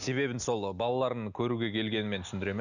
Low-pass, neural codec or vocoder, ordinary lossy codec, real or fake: 7.2 kHz; none; none; real